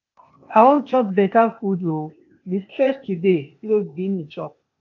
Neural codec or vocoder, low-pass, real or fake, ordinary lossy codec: codec, 16 kHz, 0.8 kbps, ZipCodec; 7.2 kHz; fake; AAC, 48 kbps